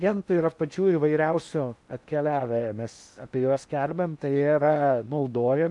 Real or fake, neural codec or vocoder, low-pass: fake; codec, 16 kHz in and 24 kHz out, 0.8 kbps, FocalCodec, streaming, 65536 codes; 10.8 kHz